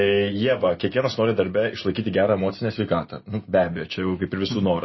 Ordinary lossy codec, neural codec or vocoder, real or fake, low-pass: MP3, 24 kbps; none; real; 7.2 kHz